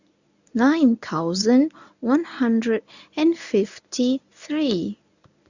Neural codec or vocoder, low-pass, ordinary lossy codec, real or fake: codec, 24 kHz, 0.9 kbps, WavTokenizer, medium speech release version 1; 7.2 kHz; none; fake